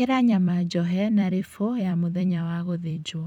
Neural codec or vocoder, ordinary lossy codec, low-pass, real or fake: vocoder, 44.1 kHz, 128 mel bands every 256 samples, BigVGAN v2; none; 19.8 kHz; fake